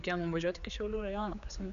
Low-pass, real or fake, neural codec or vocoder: 7.2 kHz; fake; codec, 16 kHz, 4 kbps, X-Codec, HuBERT features, trained on general audio